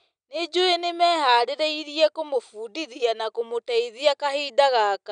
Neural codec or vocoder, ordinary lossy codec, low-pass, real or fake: none; none; 9.9 kHz; real